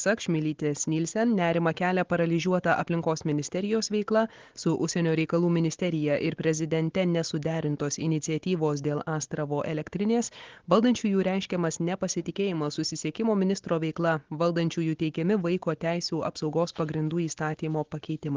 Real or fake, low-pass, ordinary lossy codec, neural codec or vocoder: real; 7.2 kHz; Opus, 16 kbps; none